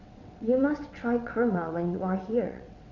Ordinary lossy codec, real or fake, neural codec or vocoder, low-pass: none; fake; vocoder, 22.05 kHz, 80 mel bands, WaveNeXt; 7.2 kHz